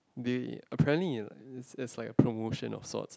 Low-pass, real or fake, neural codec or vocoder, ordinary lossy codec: none; real; none; none